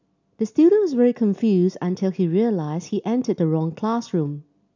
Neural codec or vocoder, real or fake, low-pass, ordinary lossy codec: none; real; 7.2 kHz; none